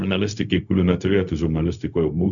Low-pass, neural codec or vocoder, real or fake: 7.2 kHz; codec, 16 kHz, 0.4 kbps, LongCat-Audio-Codec; fake